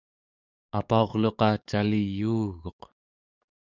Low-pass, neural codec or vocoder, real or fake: 7.2 kHz; codec, 44.1 kHz, 7.8 kbps, DAC; fake